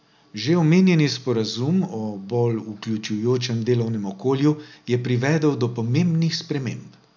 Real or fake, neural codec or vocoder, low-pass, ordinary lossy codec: real; none; 7.2 kHz; none